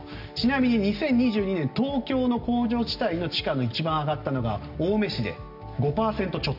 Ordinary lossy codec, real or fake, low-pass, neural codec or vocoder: none; real; 5.4 kHz; none